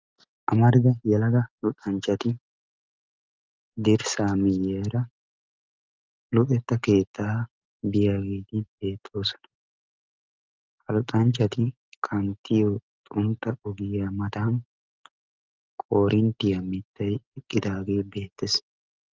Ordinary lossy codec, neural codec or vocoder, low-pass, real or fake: Opus, 32 kbps; none; 7.2 kHz; real